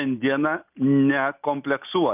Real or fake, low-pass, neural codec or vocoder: real; 3.6 kHz; none